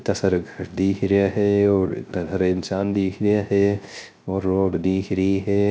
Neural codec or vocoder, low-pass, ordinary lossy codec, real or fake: codec, 16 kHz, 0.3 kbps, FocalCodec; none; none; fake